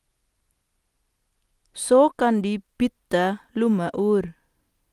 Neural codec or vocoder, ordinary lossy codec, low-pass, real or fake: none; Opus, 32 kbps; 14.4 kHz; real